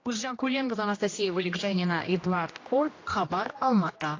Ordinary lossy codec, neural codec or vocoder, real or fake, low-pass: AAC, 32 kbps; codec, 16 kHz, 1 kbps, X-Codec, HuBERT features, trained on general audio; fake; 7.2 kHz